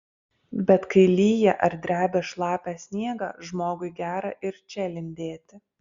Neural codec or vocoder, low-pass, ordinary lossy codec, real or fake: none; 7.2 kHz; Opus, 64 kbps; real